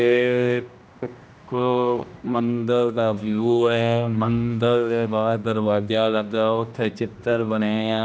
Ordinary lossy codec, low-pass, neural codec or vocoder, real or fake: none; none; codec, 16 kHz, 1 kbps, X-Codec, HuBERT features, trained on general audio; fake